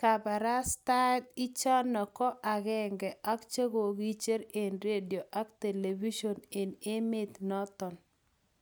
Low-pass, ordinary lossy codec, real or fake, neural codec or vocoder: none; none; real; none